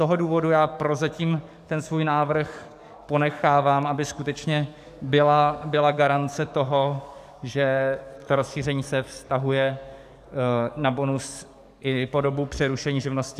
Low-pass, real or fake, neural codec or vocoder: 14.4 kHz; fake; codec, 44.1 kHz, 7.8 kbps, DAC